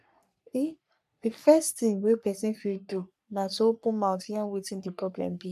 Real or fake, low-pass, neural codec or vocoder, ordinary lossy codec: fake; 14.4 kHz; codec, 44.1 kHz, 3.4 kbps, Pupu-Codec; none